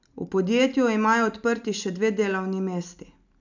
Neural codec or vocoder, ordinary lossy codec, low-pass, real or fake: none; none; 7.2 kHz; real